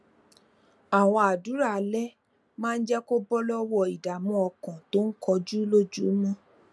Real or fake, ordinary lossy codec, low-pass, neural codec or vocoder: real; none; none; none